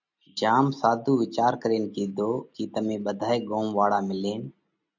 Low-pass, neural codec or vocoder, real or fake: 7.2 kHz; none; real